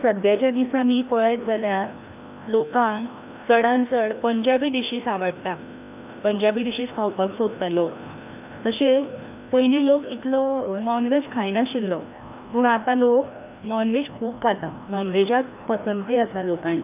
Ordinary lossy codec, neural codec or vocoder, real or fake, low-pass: AAC, 32 kbps; codec, 16 kHz, 1 kbps, FreqCodec, larger model; fake; 3.6 kHz